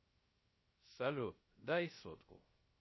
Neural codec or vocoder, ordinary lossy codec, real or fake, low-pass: codec, 16 kHz, 0.3 kbps, FocalCodec; MP3, 24 kbps; fake; 7.2 kHz